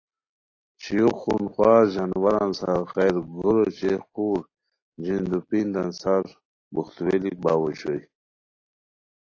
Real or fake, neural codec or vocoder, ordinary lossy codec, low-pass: real; none; AAC, 32 kbps; 7.2 kHz